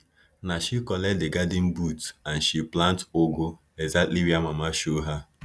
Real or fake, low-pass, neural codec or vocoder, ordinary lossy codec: real; none; none; none